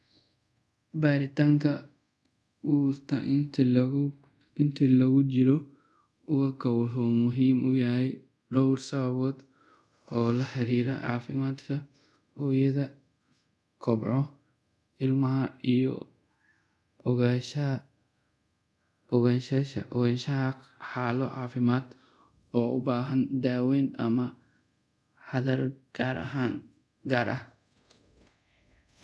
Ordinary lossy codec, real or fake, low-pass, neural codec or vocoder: none; fake; none; codec, 24 kHz, 0.5 kbps, DualCodec